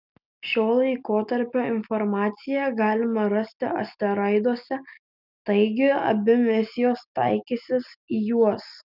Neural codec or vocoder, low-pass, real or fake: none; 5.4 kHz; real